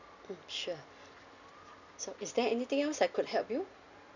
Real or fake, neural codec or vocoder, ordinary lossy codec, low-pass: real; none; none; 7.2 kHz